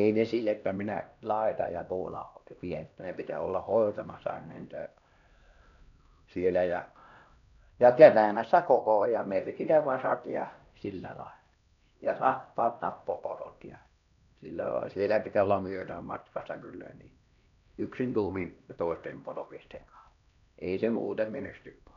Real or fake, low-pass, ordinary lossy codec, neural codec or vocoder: fake; 7.2 kHz; MP3, 96 kbps; codec, 16 kHz, 1 kbps, X-Codec, HuBERT features, trained on LibriSpeech